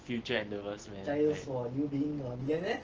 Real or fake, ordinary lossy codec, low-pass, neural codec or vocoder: real; Opus, 16 kbps; 7.2 kHz; none